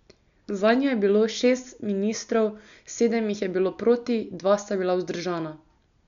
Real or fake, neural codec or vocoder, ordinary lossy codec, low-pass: real; none; none; 7.2 kHz